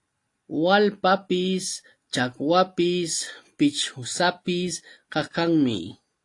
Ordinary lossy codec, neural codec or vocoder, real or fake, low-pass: AAC, 48 kbps; none; real; 10.8 kHz